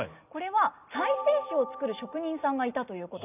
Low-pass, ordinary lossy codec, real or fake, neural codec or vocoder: 3.6 kHz; none; real; none